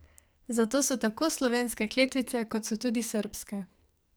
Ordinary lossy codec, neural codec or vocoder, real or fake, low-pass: none; codec, 44.1 kHz, 2.6 kbps, SNAC; fake; none